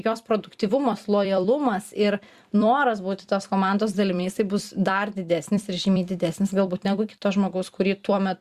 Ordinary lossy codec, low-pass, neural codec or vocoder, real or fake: Opus, 64 kbps; 14.4 kHz; vocoder, 44.1 kHz, 128 mel bands every 256 samples, BigVGAN v2; fake